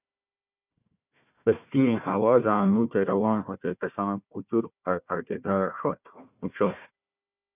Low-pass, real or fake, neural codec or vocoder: 3.6 kHz; fake; codec, 16 kHz, 1 kbps, FunCodec, trained on Chinese and English, 50 frames a second